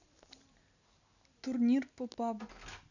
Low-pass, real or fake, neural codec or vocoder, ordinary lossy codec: 7.2 kHz; real; none; none